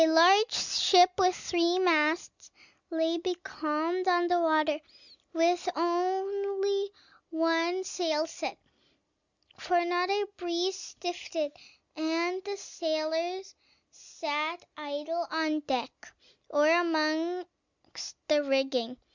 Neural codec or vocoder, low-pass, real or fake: none; 7.2 kHz; real